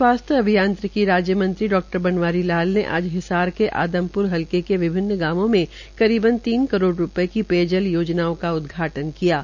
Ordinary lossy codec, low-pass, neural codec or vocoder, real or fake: none; 7.2 kHz; none; real